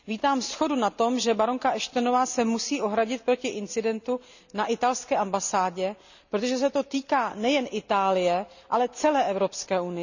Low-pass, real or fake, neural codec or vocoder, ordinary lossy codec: 7.2 kHz; real; none; none